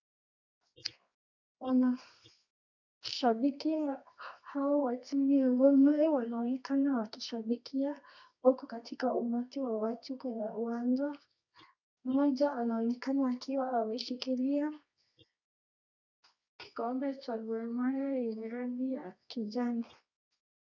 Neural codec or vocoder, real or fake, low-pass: codec, 24 kHz, 0.9 kbps, WavTokenizer, medium music audio release; fake; 7.2 kHz